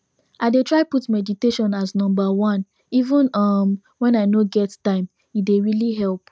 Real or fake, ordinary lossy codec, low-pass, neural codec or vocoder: real; none; none; none